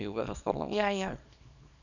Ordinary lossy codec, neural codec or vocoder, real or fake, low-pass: none; codec, 24 kHz, 0.9 kbps, WavTokenizer, small release; fake; 7.2 kHz